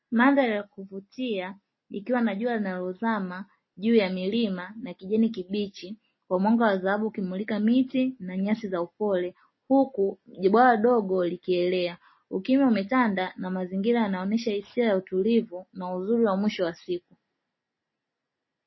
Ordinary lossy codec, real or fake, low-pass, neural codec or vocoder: MP3, 24 kbps; real; 7.2 kHz; none